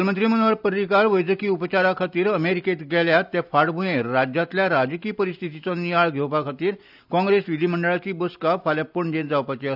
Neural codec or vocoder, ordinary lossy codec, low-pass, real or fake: none; none; 5.4 kHz; real